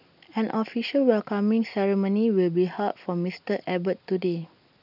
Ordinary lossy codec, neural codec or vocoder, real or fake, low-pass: none; none; real; 5.4 kHz